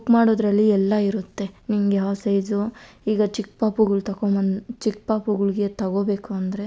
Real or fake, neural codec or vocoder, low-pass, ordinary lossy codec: real; none; none; none